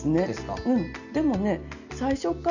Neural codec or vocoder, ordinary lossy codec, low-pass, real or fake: none; none; 7.2 kHz; real